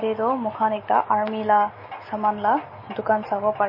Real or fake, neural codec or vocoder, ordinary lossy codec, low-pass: real; none; MP3, 24 kbps; 5.4 kHz